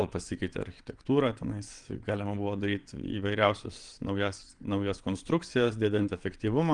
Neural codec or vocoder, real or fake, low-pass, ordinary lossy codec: vocoder, 22.05 kHz, 80 mel bands, WaveNeXt; fake; 9.9 kHz; Opus, 24 kbps